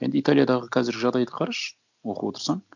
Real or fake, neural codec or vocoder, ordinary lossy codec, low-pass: real; none; none; 7.2 kHz